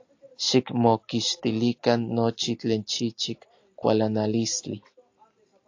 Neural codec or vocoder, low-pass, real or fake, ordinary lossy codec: none; 7.2 kHz; real; AAC, 48 kbps